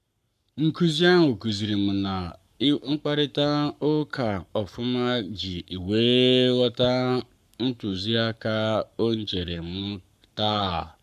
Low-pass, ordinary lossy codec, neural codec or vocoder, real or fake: 14.4 kHz; none; codec, 44.1 kHz, 7.8 kbps, Pupu-Codec; fake